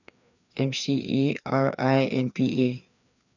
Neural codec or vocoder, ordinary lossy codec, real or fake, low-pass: codec, 16 kHz, 2 kbps, FreqCodec, larger model; none; fake; 7.2 kHz